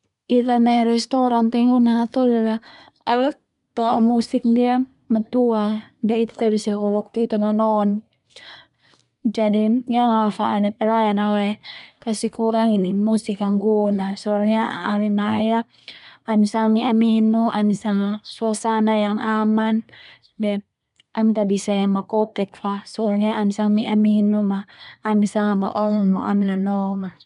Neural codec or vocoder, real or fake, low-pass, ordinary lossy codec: codec, 24 kHz, 1 kbps, SNAC; fake; 10.8 kHz; none